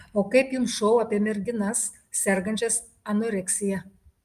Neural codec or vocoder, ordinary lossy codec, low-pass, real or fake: none; Opus, 32 kbps; 14.4 kHz; real